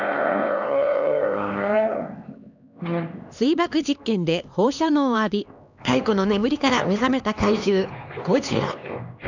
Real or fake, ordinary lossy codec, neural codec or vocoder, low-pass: fake; none; codec, 16 kHz, 2 kbps, X-Codec, HuBERT features, trained on LibriSpeech; 7.2 kHz